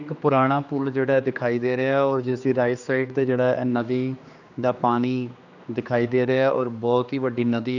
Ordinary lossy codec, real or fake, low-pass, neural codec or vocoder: none; fake; 7.2 kHz; codec, 16 kHz, 2 kbps, X-Codec, HuBERT features, trained on general audio